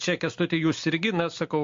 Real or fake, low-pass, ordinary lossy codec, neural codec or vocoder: real; 7.2 kHz; MP3, 48 kbps; none